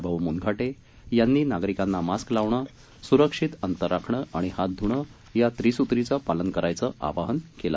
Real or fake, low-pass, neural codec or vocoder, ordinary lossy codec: real; none; none; none